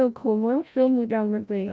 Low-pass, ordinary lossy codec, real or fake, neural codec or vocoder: none; none; fake; codec, 16 kHz, 0.5 kbps, FreqCodec, larger model